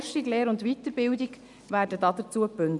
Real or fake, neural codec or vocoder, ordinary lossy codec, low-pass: real; none; none; 10.8 kHz